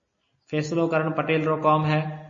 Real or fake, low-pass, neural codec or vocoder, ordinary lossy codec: real; 7.2 kHz; none; MP3, 32 kbps